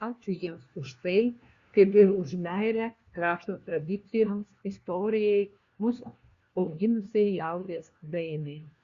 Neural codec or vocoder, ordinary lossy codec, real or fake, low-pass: codec, 16 kHz, 1 kbps, FunCodec, trained on LibriTTS, 50 frames a second; Opus, 64 kbps; fake; 7.2 kHz